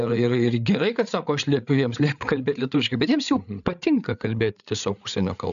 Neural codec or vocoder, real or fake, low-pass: codec, 16 kHz, 8 kbps, FreqCodec, larger model; fake; 7.2 kHz